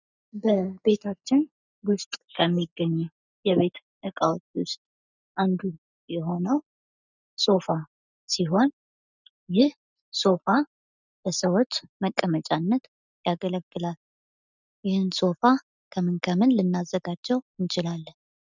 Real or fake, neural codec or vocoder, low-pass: real; none; 7.2 kHz